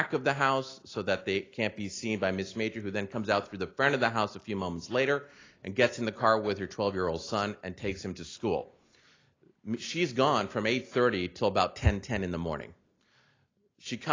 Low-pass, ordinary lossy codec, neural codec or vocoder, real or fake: 7.2 kHz; AAC, 32 kbps; none; real